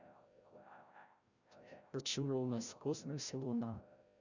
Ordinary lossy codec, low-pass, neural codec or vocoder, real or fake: Opus, 64 kbps; 7.2 kHz; codec, 16 kHz, 0.5 kbps, FreqCodec, larger model; fake